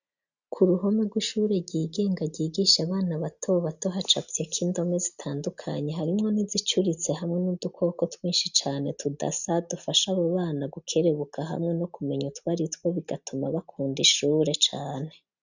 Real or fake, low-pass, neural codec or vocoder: real; 7.2 kHz; none